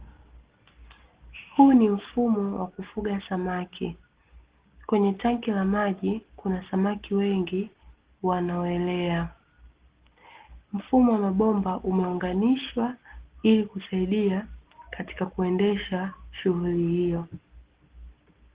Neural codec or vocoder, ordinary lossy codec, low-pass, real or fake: none; Opus, 16 kbps; 3.6 kHz; real